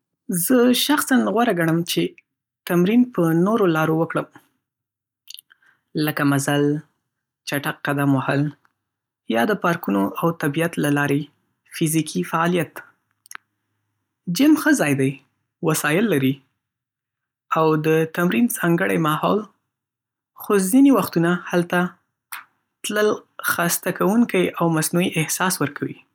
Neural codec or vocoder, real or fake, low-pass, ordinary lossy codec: none; real; 19.8 kHz; none